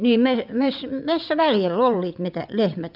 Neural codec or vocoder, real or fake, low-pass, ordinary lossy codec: vocoder, 22.05 kHz, 80 mel bands, WaveNeXt; fake; 5.4 kHz; none